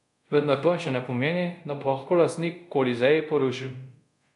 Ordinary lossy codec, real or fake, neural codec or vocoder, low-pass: none; fake; codec, 24 kHz, 0.5 kbps, DualCodec; 10.8 kHz